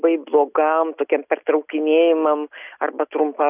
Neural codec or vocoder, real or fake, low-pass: none; real; 3.6 kHz